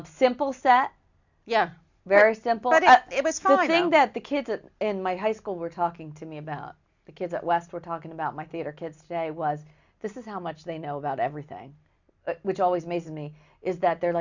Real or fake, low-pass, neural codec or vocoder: real; 7.2 kHz; none